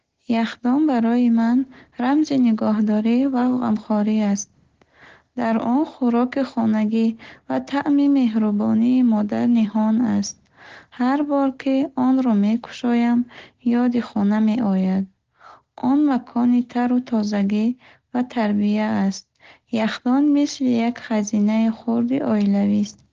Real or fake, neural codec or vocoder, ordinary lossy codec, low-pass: real; none; Opus, 16 kbps; 7.2 kHz